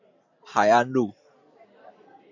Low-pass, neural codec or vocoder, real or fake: 7.2 kHz; none; real